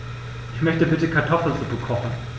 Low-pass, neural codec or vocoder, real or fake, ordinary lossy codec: none; none; real; none